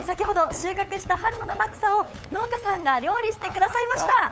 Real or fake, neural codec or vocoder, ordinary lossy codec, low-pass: fake; codec, 16 kHz, 8 kbps, FunCodec, trained on LibriTTS, 25 frames a second; none; none